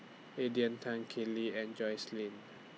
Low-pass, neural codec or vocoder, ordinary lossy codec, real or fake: none; none; none; real